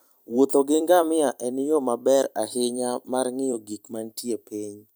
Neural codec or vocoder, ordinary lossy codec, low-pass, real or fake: vocoder, 44.1 kHz, 128 mel bands every 256 samples, BigVGAN v2; none; none; fake